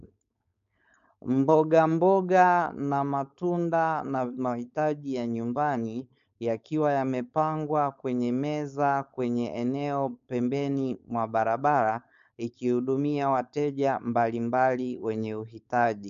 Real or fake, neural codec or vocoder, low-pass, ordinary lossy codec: fake; codec, 16 kHz, 4.8 kbps, FACodec; 7.2 kHz; MP3, 64 kbps